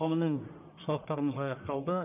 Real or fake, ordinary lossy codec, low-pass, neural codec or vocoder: fake; none; 3.6 kHz; codec, 44.1 kHz, 1.7 kbps, Pupu-Codec